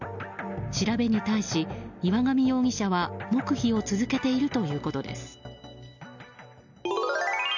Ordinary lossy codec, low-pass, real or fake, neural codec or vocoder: none; 7.2 kHz; real; none